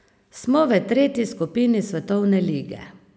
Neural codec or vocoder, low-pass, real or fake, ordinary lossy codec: none; none; real; none